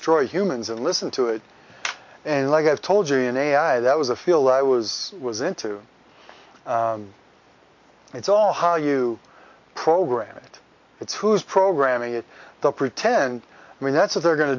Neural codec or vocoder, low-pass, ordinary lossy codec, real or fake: none; 7.2 kHz; MP3, 48 kbps; real